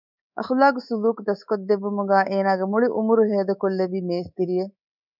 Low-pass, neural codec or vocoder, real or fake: 5.4 kHz; codec, 24 kHz, 3.1 kbps, DualCodec; fake